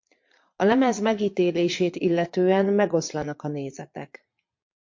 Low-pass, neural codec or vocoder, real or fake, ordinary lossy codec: 7.2 kHz; vocoder, 22.05 kHz, 80 mel bands, Vocos; fake; MP3, 48 kbps